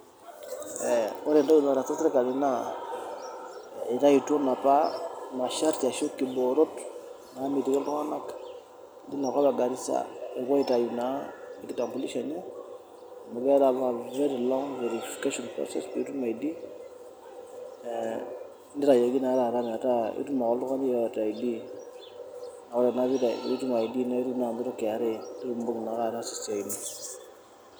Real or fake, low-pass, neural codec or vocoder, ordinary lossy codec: real; none; none; none